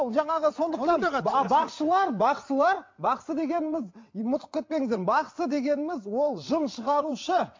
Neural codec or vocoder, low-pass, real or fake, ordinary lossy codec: vocoder, 22.05 kHz, 80 mel bands, WaveNeXt; 7.2 kHz; fake; MP3, 48 kbps